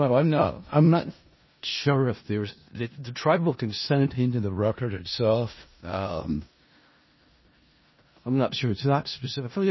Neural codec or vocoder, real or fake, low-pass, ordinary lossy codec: codec, 16 kHz in and 24 kHz out, 0.4 kbps, LongCat-Audio-Codec, four codebook decoder; fake; 7.2 kHz; MP3, 24 kbps